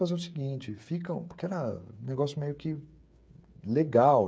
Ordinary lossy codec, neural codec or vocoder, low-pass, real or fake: none; codec, 16 kHz, 8 kbps, FreqCodec, smaller model; none; fake